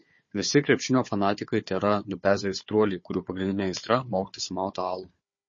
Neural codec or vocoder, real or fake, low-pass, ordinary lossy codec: codec, 16 kHz, 4 kbps, FunCodec, trained on Chinese and English, 50 frames a second; fake; 7.2 kHz; MP3, 32 kbps